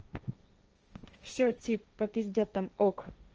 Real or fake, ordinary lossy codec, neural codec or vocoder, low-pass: fake; Opus, 24 kbps; codec, 16 kHz, 1.1 kbps, Voila-Tokenizer; 7.2 kHz